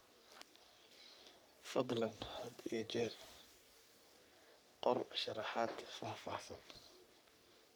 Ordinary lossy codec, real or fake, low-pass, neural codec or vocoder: none; fake; none; codec, 44.1 kHz, 3.4 kbps, Pupu-Codec